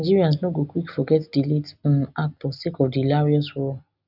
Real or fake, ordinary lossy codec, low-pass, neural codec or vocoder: real; none; 5.4 kHz; none